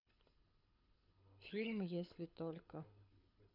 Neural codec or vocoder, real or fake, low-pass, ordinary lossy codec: codec, 24 kHz, 6 kbps, HILCodec; fake; 5.4 kHz; none